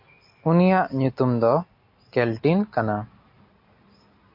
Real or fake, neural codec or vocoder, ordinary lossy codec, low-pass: real; none; MP3, 32 kbps; 5.4 kHz